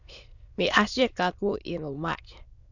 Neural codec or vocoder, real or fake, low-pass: autoencoder, 22.05 kHz, a latent of 192 numbers a frame, VITS, trained on many speakers; fake; 7.2 kHz